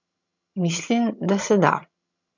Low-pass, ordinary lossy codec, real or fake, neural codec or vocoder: 7.2 kHz; none; fake; vocoder, 22.05 kHz, 80 mel bands, HiFi-GAN